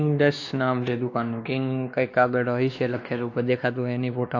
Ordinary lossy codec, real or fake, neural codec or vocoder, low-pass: AAC, 48 kbps; fake; codec, 16 kHz, 2 kbps, X-Codec, WavLM features, trained on Multilingual LibriSpeech; 7.2 kHz